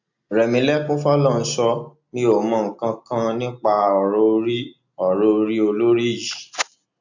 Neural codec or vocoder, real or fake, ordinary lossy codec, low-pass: none; real; none; 7.2 kHz